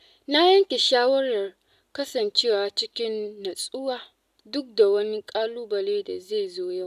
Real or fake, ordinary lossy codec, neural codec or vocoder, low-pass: real; none; none; 14.4 kHz